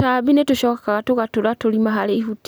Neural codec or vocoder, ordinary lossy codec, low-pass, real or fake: none; none; none; real